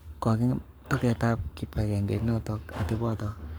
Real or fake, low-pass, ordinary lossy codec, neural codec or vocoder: fake; none; none; codec, 44.1 kHz, 7.8 kbps, Pupu-Codec